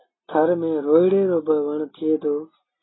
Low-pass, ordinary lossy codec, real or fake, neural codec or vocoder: 7.2 kHz; AAC, 16 kbps; real; none